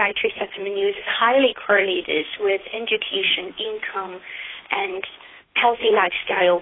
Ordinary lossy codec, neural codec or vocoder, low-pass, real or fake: AAC, 16 kbps; codec, 16 kHz, 2 kbps, FunCodec, trained on Chinese and English, 25 frames a second; 7.2 kHz; fake